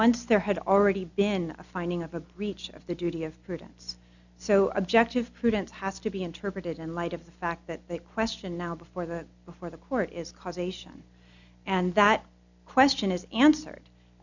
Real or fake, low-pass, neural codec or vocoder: real; 7.2 kHz; none